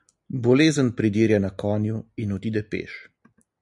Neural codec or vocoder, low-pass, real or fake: none; 10.8 kHz; real